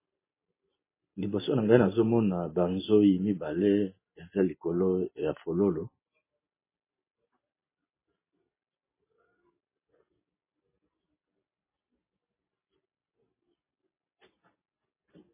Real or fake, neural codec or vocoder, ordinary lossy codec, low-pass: real; none; MP3, 16 kbps; 3.6 kHz